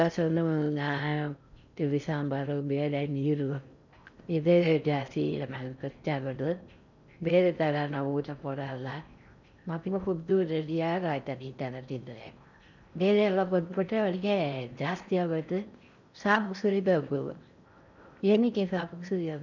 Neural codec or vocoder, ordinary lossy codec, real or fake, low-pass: codec, 16 kHz in and 24 kHz out, 0.6 kbps, FocalCodec, streaming, 4096 codes; none; fake; 7.2 kHz